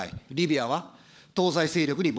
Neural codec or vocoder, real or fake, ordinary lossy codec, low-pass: codec, 16 kHz, 16 kbps, FunCodec, trained on LibriTTS, 50 frames a second; fake; none; none